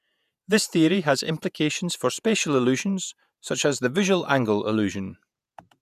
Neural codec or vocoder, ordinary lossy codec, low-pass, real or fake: vocoder, 48 kHz, 128 mel bands, Vocos; none; 14.4 kHz; fake